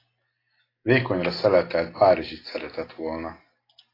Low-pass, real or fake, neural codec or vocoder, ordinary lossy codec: 5.4 kHz; real; none; AAC, 24 kbps